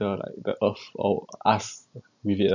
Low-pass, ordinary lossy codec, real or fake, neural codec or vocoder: 7.2 kHz; none; fake; vocoder, 44.1 kHz, 128 mel bands every 512 samples, BigVGAN v2